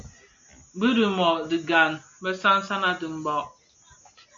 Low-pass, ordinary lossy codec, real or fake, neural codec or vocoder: 7.2 kHz; AAC, 64 kbps; real; none